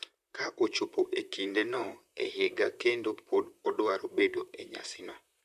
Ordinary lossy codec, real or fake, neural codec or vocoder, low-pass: none; fake; vocoder, 44.1 kHz, 128 mel bands, Pupu-Vocoder; 14.4 kHz